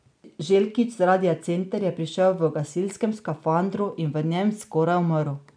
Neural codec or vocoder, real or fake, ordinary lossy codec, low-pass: none; real; none; 9.9 kHz